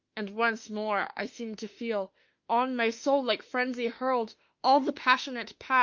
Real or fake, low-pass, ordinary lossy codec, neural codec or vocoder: fake; 7.2 kHz; Opus, 24 kbps; autoencoder, 48 kHz, 32 numbers a frame, DAC-VAE, trained on Japanese speech